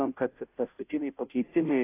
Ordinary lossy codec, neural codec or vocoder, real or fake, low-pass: AAC, 24 kbps; codec, 16 kHz, 0.5 kbps, FunCodec, trained on Chinese and English, 25 frames a second; fake; 3.6 kHz